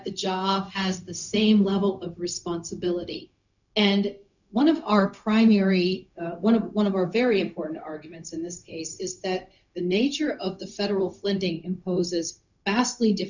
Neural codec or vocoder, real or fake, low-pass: codec, 16 kHz, 0.4 kbps, LongCat-Audio-Codec; fake; 7.2 kHz